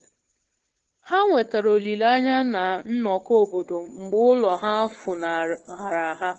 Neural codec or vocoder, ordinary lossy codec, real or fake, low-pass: codec, 16 kHz, 2 kbps, FunCodec, trained on Chinese and English, 25 frames a second; Opus, 16 kbps; fake; 7.2 kHz